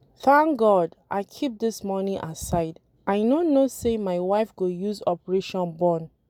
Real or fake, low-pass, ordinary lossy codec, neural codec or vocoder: real; 19.8 kHz; none; none